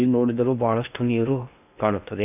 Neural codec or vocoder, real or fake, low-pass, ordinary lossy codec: codec, 16 kHz in and 24 kHz out, 0.8 kbps, FocalCodec, streaming, 65536 codes; fake; 3.6 kHz; none